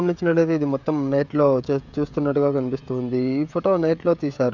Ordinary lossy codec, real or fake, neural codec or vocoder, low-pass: none; fake; codec, 16 kHz, 16 kbps, FreqCodec, smaller model; 7.2 kHz